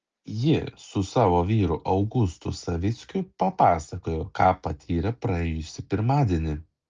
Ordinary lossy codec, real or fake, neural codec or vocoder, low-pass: Opus, 24 kbps; real; none; 7.2 kHz